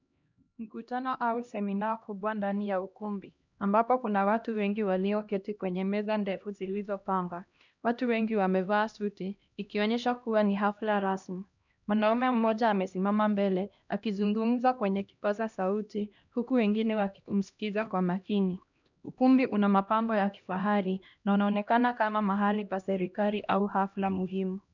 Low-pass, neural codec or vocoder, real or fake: 7.2 kHz; codec, 16 kHz, 1 kbps, X-Codec, HuBERT features, trained on LibriSpeech; fake